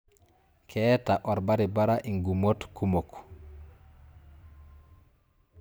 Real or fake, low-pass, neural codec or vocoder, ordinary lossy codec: real; none; none; none